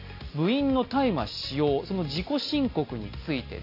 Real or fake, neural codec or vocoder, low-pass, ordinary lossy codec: real; none; 5.4 kHz; AAC, 32 kbps